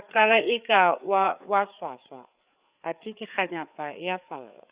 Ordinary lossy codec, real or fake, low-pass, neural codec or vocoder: Opus, 64 kbps; fake; 3.6 kHz; codec, 16 kHz, 4 kbps, FreqCodec, larger model